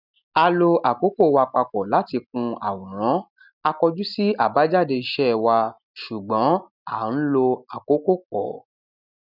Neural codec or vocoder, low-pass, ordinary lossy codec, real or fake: none; 5.4 kHz; none; real